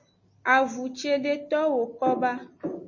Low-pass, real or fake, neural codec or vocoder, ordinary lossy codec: 7.2 kHz; real; none; MP3, 32 kbps